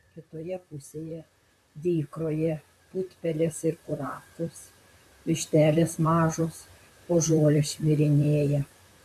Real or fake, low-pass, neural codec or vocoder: fake; 14.4 kHz; vocoder, 44.1 kHz, 128 mel bands, Pupu-Vocoder